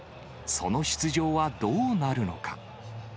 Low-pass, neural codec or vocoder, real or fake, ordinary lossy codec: none; none; real; none